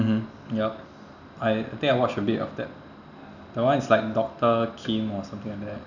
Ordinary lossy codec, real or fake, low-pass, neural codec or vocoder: none; real; 7.2 kHz; none